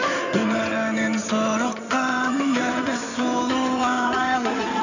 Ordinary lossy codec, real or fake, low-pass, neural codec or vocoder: none; fake; 7.2 kHz; codec, 16 kHz in and 24 kHz out, 2.2 kbps, FireRedTTS-2 codec